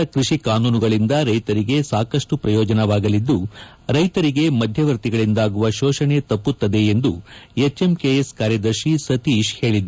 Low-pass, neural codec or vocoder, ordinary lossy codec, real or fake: none; none; none; real